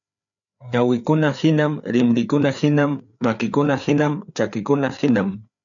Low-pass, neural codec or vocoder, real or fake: 7.2 kHz; codec, 16 kHz, 4 kbps, FreqCodec, larger model; fake